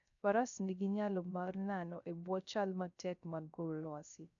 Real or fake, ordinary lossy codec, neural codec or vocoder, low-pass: fake; none; codec, 16 kHz, 0.3 kbps, FocalCodec; 7.2 kHz